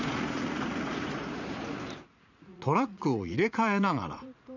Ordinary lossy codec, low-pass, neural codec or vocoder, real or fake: none; 7.2 kHz; vocoder, 44.1 kHz, 80 mel bands, Vocos; fake